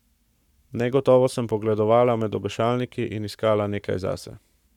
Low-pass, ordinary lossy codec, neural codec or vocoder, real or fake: 19.8 kHz; none; codec, 44.1 kHz, 7.8 kbps, Pupu-Codec; fake